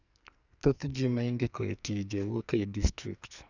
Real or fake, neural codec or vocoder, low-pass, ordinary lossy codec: fake; codec, 44.1 kHz, 2.6 kbps, SNAC; 7.2 kHz; none